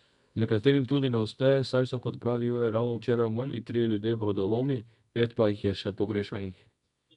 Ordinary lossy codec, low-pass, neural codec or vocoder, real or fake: none; 10.8 kHz; codec, 24 kHz, 0.9 kbps, WavTokenizer, medium music audio release; fake